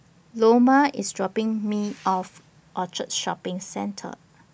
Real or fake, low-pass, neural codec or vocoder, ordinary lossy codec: real; none; none; none